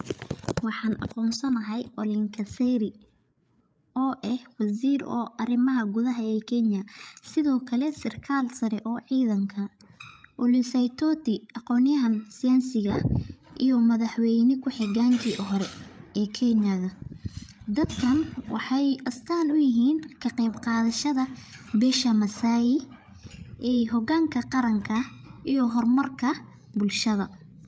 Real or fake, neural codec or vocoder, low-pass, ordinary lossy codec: fake; codec, 16 kHz, 8 kbps, FreqCodec, larger model; none; none